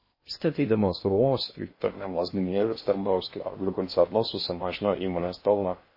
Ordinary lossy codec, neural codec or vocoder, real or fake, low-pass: MP3, 24 kbps; codec, 16 kHz in and 24 kHz out, 0.6 kbps, FocalCodec, streaming, 2048 codes; fake; 5.4 kHz